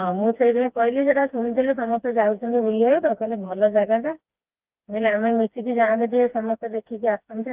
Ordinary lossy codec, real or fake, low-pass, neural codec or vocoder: Opus, 24 kbps; fake; 3.6 kHz; codec, 16 kHz, 2 kbps, FreqCodec, smaller model